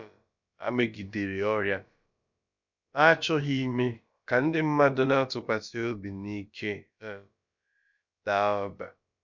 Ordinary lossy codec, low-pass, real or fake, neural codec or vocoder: none; 7.2 kHz; fake; codec, 16 kHz, about 1 kbps, DyCAST, with the encoder's durations